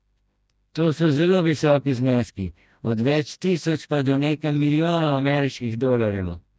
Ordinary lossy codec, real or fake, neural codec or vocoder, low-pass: none; fake; codec, 16 kHz, 1 kbps, FreqCodec, smaller model; none